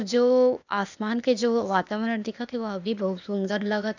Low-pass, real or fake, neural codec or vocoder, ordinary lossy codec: 7.2 kHz; fake; codec, 16 kHz, 0.8 kbps, ZipCodec; none